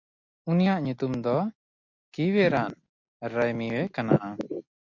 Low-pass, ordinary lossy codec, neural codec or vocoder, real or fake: 7.2 kHz; MP3, 48 kbps; none; real